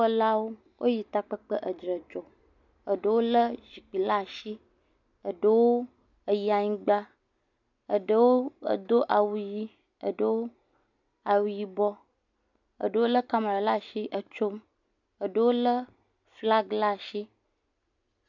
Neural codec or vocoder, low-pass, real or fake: none; 7.2 kHz; real